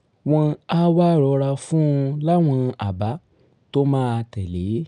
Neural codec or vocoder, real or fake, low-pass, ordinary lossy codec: none; real; 9.9 kHz; none